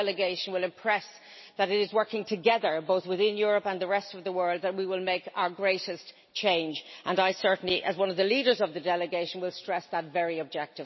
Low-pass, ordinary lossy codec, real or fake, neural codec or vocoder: 7.2 kHz; MP3, 24 kbps; real; none